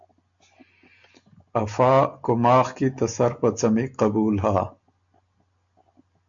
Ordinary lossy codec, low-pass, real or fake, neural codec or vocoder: AAC, 64 kbps; 7.2 kHz; real; none